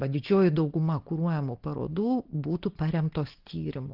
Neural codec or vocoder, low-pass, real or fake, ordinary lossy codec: none; 5.4 kHz; real; Opus, 16 kbps